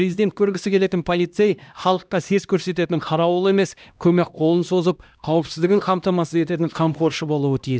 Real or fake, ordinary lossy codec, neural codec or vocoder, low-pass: fake; none; codec, 16 kHz, 1 kbps, X-Codec, HuBERT features, trained on LibriSpeech; none